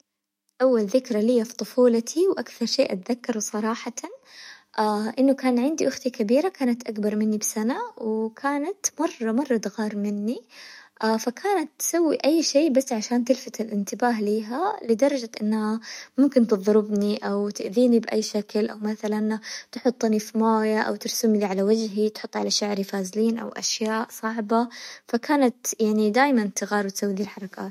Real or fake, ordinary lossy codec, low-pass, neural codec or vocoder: fake; MP3, 64 kbps; 19.8 kHz; autoencoder, 48 kHz, 128 numbers a frame, DAC-VAE, trained on Japanese speech